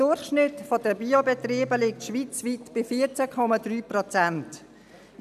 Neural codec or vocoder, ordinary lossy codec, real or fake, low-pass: none; none; real; 14.4 kHz